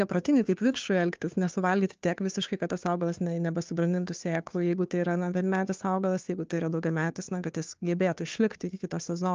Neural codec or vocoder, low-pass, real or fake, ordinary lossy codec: codec, 16 kHz, 2 kbps, FunCodec, trained on Chinese and English, 25 frames a second; 7.2 kHz; fake; Opus, 24 kbps